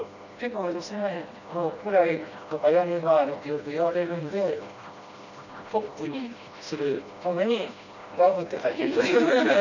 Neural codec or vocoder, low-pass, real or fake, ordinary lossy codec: codec, 16 kHz, 1 kbps, FreqCodec, smaller model; 7.2 kHz; fake; none